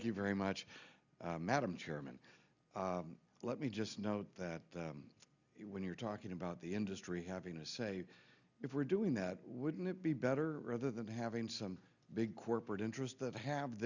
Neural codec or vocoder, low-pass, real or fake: none; 7.2 kHz; real